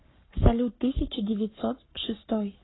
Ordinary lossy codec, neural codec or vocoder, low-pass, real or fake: AAC, 16 kbps; codec, 44.1 kHz, 7.8 kbps, Pupu-Codec; 7.2 kHz; fake